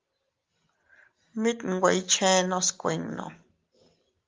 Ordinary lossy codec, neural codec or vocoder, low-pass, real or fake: Opus, 32 kbps; none; 7.2 kHz; real